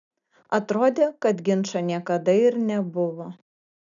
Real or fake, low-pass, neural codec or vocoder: real; 7.2 kHz; none